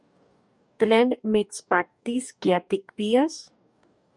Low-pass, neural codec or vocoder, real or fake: 10.8 kHz; codec, 44.1 kHz, 2.6 kbps, DAC; fake